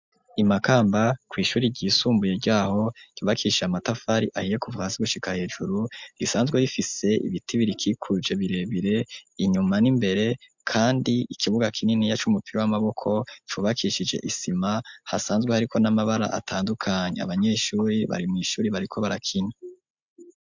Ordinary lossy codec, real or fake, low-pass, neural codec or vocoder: MP3, 64 kbps; real; 7.2 kHz; none